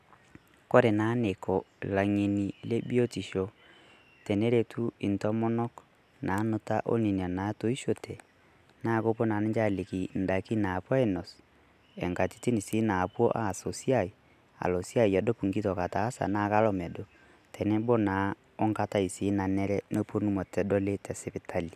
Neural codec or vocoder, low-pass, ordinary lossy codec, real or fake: none; 14.4 kHz; none; real